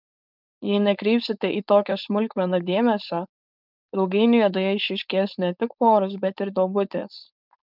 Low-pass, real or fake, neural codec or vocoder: 5.4 kHz; fake; codec, 16 kHz, 4.8 kbps, FACodec